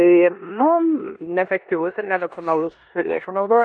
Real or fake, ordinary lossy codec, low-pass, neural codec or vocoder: fake; MP3, 64 kbps; 9.9 kHz; codec, 16 kHz in and 24 kHz out, 0.9 kbps, LongCat-Audio-Codec, four codebook decoder